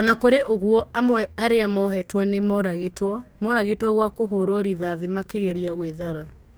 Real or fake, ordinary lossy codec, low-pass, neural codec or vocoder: fake; none; none; codec, 44.1 kHz, 2.6 kbps, DAC